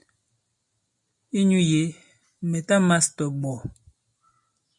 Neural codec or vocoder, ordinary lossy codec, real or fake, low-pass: none; MP3, 48 kbps; real; 10.8 kHz